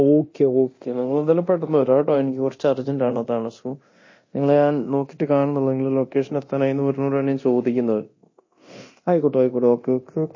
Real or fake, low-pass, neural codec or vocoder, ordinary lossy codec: fake; 7.2 kHz; codec, 24 kHz, 0.9 kbps, DualCodec; MP3, 32 kbps